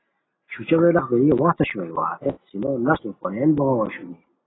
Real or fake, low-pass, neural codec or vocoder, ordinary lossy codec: real; 7.2 kHz; none; AAC, 16 kbps